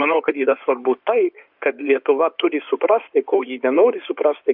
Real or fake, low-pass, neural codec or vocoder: fake; 5.4 kHz; codec, 16 kHz in and 24 kHz out, 2.2 kbps, FireRedTTS-2 codec